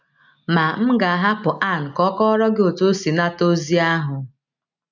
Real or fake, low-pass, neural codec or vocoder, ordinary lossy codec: real; 7.2 kHz; none; none